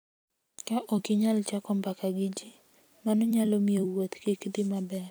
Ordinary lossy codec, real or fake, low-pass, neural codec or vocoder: none; fake; none; vocoder, 44.1 kHz, 128 mel bands every 256 samples, BigVGAN v2